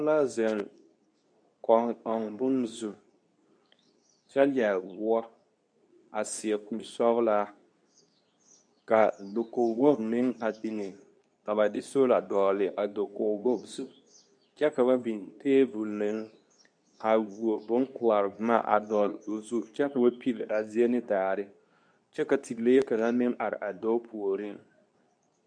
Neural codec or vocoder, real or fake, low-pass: codec, 24 kHz, 0.9 kbps, WavTokenizer, medium speech release version 2; fake; 9.9 kHz